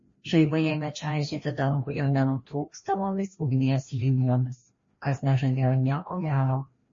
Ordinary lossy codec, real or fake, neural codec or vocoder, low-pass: MP3, 32 kbps; fake; codec, 16 kHz, 1 kbps, FreqCodec, larger model; 7.2 kHz